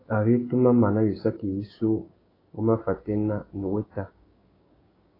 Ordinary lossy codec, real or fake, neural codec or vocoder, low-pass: AAC, 24 kbps; fake; codec, 16 kHz, 6 kbps, DAC; 5.4 kHz